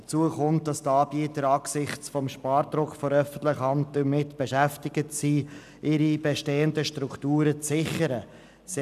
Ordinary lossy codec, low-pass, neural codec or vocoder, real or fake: none; 14.4 kHz; none; real